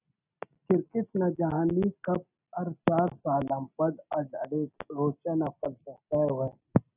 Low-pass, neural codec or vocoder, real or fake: 3.6 kHz; none; real